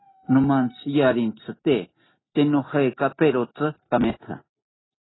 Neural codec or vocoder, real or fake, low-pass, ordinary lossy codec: none; real; 7.2 kHz; AAC, 16 kbps